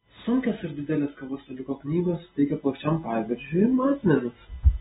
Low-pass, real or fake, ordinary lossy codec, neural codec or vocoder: 7.2 kHz; real; AAC, 16 kbps; none